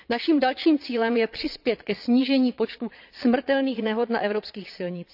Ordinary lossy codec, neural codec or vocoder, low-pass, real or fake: none; vocoder, 22.05 kHz, 80 mel bands, Vocos; 5.4 kHz; fake